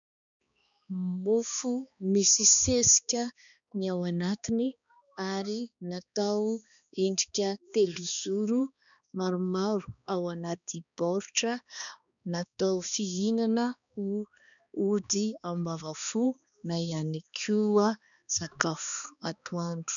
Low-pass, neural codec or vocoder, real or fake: 7.2 kHz; codec, 16 kHz, 2 kbps, X-Codec, HuBERT features, trained on balanced general audio; fake